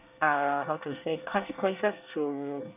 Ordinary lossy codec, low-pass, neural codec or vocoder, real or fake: none; 3.6 kHz; codec, 24 kHz, 1 kbps, SNAC; fake